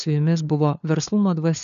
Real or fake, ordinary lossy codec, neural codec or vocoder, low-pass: fake; AAC, 96 kbps; codec, 16 kHz, 4 kbps, FunCodec, trained on LibriTTS, 50 frames a second; 7.2 kHz